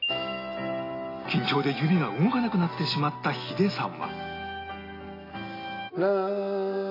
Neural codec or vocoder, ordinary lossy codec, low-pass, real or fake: none; AAC, 24 kbps; 5.4 kHz; real